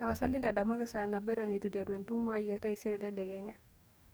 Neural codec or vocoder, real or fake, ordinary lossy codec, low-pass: codec, 44.1 kHz, 2.6 kbps, DAC; fake; none; none